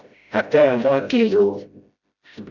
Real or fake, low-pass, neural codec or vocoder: fake; 7.2 kHz; codec, 16 kHz, 0.5 kbps, FreqCodec, smaller model